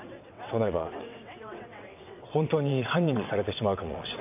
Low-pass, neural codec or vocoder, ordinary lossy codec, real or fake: 3.6 kHz; vocoder, 22.05 kHz, 80 mel bands, WaveNeXt; AAC, 32 kbps; fake